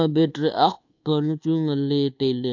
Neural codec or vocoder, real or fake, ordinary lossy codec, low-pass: codec, 24 kHz, 1.2 kbps, DualCodec; fake; AAC, 48 kbps; 7.2 kHz